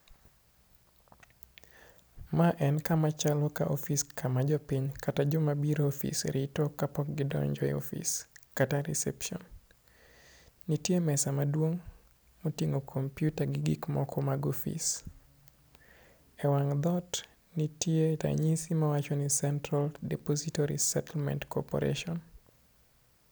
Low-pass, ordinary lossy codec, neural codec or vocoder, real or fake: none; none; none; real